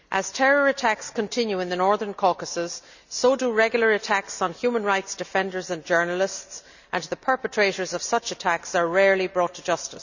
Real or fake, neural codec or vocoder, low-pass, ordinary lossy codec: real; none; 7.2 kHz; none